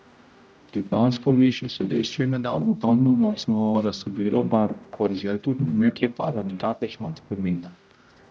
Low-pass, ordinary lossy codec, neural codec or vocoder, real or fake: none; none; codec, 16 kHz, 0.5 kbps, X-Codec, HuBERT features, trained on general audio; fake